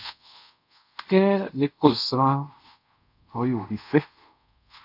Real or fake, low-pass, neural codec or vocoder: fake; 5.4 kHz; codec, 24 kHz, 0.5 kbps, DualCodec